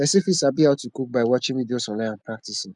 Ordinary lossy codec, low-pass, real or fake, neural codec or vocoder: none; 10.8 kHz; real; none